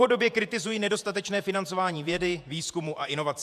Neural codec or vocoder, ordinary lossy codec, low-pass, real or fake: vocoder, 48 kHz, 128 mel bands, Vocos; AAC, 96 kbps; 14.4 kHz; fake